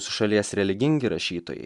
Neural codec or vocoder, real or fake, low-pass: none; real; 10.8 kHz